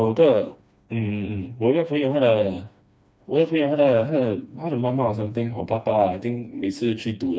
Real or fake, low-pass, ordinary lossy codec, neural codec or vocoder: fake; none; none; codec, 16 kHz, 2 kbps, FreqCodec, smaller model